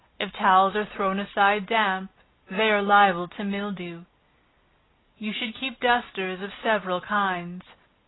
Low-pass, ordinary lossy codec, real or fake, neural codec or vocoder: 7.2 kHz; AAC, 16 kbps; real; none